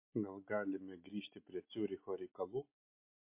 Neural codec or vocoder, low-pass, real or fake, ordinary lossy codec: none; 3.6 kHz; real; MP3, 24 kbps